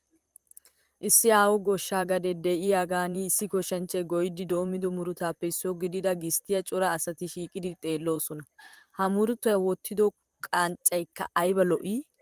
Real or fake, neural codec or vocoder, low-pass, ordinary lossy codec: fake; vocoder, 44.1 kHz, 128 mel bands, Pupu-Vocoder; 14.4 kHz; Opus, 32 kbps